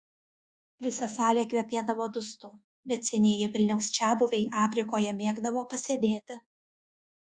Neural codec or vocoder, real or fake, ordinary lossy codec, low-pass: codec, 24 kHz, 1.2 kbps, DualCodec; fake; Opus, 64 kbps; 9.9 kHz